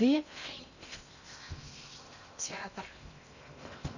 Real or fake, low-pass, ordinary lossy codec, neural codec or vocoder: fake; 7.2 kHz; none; codec, 16 kHz in and 24 kHz out, 0.6 kbps, FocalCodec, streaming, 2048 codes